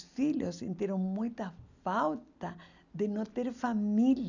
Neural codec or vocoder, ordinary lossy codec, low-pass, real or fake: none; none; 7.2 kHz; real